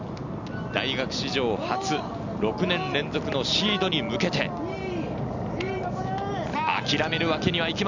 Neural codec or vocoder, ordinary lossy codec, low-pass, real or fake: none; none; 7.2 kHz; real